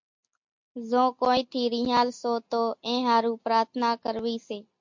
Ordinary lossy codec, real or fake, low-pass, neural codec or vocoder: MP3, 48 kbps; real; 7.2 kHz; none